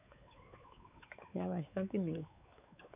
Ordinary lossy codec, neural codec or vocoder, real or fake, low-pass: none; codec, 16 kHz, 4 kbps, X-Codec, WavLM features, trained on Multilingual LibriSpeech; fake; 3.6 kHz